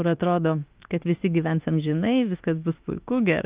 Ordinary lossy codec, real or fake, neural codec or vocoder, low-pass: Opus, 24 kbps; fake; autoencoder, 48 kHz, 32 numbers a frame, DAC-VAE, trained on Japanese speech; 3.6 kHz